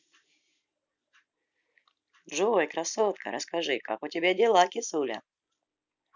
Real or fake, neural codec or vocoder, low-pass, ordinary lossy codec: real; none; 7.2 kHz; none